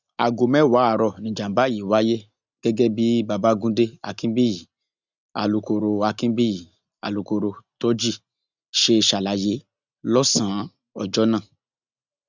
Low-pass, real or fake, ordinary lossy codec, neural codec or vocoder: 7.2 kHz; real; none; none